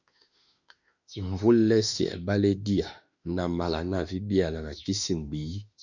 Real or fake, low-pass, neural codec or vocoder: fake; 7.2 kHz; autoencoder, 48 kHz, 32 numbers a frame, DAC-VAE, trained on Japanese speech